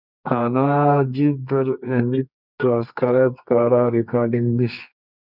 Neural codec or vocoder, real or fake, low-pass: codec, 24 kHz, 0.9 kbps, WavTokenizer, medium music audio release; fake; 5.4 kHz